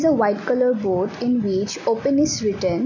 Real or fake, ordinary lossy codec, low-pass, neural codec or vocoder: real; none; 7.2 kHz; none